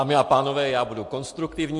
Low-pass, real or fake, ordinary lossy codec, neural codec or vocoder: 10.8 kHz; real; MP3, 48 kbps; none